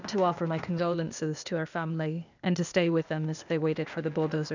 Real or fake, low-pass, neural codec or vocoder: fake; 7.2 kHz; codec, 16 kHz, 0.8 kbps, ZipCodec